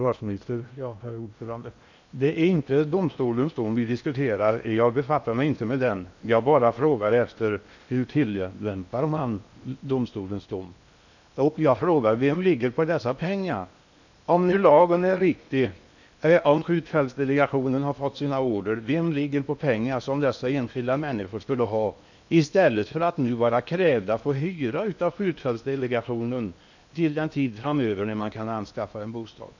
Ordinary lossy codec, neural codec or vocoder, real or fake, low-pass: none; codec, 16 kHz in and 24 kHz out, 0.8 kbps, FocalCodec, streaming, 65536 codes; fake; 7.2 kHz